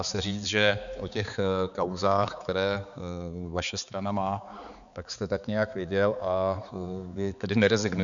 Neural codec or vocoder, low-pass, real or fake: codec, 16 kHz, 4 kbps, X-Codec, HuBERT features, trained on balanced general audio; 7.2 kHz; fake